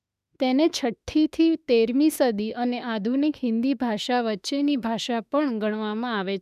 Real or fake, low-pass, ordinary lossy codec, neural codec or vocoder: fake; 14.4 kHz; none; autoencoder, 48 kHz, 32 numbers a frame, DAC-VAE, trained on Japanese speech